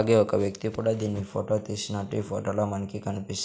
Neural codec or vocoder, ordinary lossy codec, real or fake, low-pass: none; none; real; none